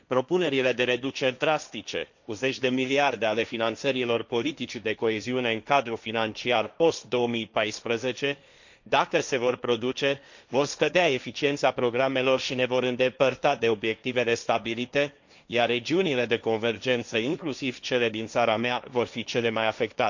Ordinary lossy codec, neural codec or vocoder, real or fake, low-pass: none; codec, 16 kHz, 1.1 kbps, Voila-Tokenizer; fake; 7.2 kHz